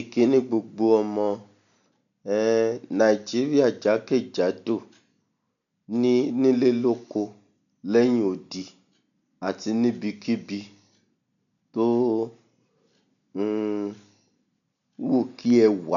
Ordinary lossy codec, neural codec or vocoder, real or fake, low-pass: none; none; real; 7.2 kHz